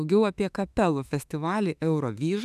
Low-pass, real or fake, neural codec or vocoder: 14.4 kHz; fake; autoencoder, 48 kHz, 32 numbers a frame, DAC-VAE, trained on Japanese speech